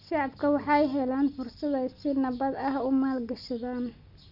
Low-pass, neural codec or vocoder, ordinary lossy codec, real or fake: 5.4 kHz; none; none; real